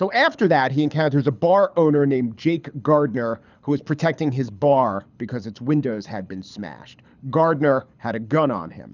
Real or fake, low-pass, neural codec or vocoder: fake; 7.2 kHz; codec, 24 kHz, 6 kbps, HILCodec